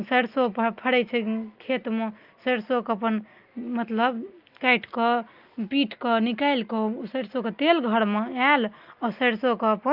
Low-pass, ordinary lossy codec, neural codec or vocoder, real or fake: 5.4 kHz; Opus, 32 kbps; none; real